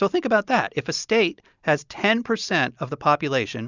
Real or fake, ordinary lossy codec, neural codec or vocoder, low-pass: real; Opus, 64 kbps; none; 7.2 kHz